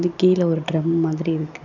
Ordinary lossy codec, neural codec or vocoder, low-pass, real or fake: none; none; 7.2 kHz; real